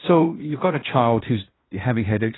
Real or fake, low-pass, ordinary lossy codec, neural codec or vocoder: fake; 7.2 kHz; AAC, 16 kbps; codec, 16 kHz in and 24 kHz out, 0.9 kbps, LongCat-Audio-Codec, fine tuned four codebook decoder